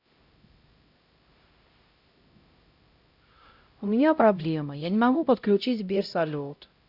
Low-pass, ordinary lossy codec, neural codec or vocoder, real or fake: 5.4 kHz; none; codec, 16 kHz, 0.5 kbps, X-Codec, HuBERT features, trained on LibriSpeech; fake